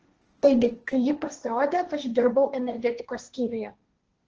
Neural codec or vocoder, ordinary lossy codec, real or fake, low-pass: codec, 16 kHz, 1.1 kbps, Voila-Tokenizer; Opus, 16 kbps; fake; 7.2 kHz